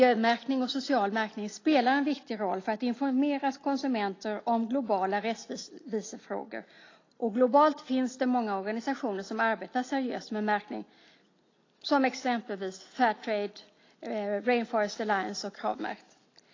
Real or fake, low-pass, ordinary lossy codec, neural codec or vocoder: real; 7.2 kHz; AAC, 32 kbps; none